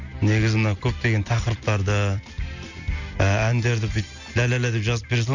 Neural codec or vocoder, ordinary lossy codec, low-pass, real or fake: none; none; 7.2 kHz; real